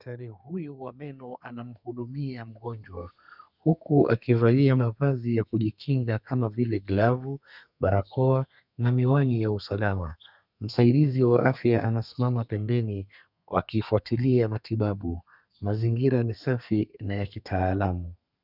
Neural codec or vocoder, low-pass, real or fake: codec, 32 kHz, 1.9 kbps, SNAC; 5.4 kHz; fake